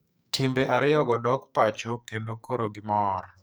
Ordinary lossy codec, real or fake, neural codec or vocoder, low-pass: none; fake; codec, 44.1 kHz, 2.6 kbps, SNAC; none